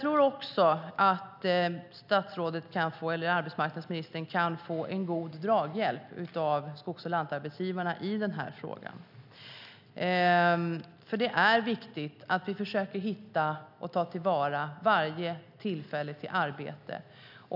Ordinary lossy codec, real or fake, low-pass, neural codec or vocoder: none; real; 5.4 kHz; none